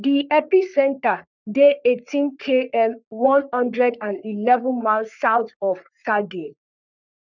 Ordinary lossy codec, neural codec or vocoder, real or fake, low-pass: none; codec, 44.1 kHz, 3.4 kbps, Pupu-Codec; fake; 7.2 kHz